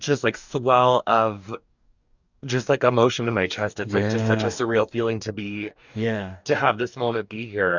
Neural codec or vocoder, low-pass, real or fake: codec, 44.1 kHz, 2.6 kbps, DAC; 7.2 kHz; fake